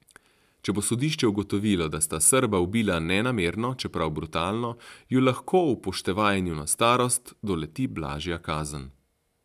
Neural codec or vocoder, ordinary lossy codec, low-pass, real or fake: none; none; 14.4 kHz; real